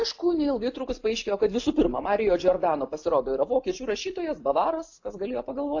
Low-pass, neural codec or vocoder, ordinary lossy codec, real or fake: 7.2 kHz; none; AAC, 48 kbps; real